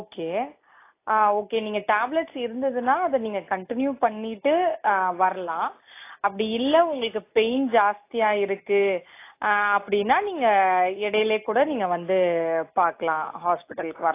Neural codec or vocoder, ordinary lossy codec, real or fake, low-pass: none; AAC, 24 kbps; real; 3.6 kHz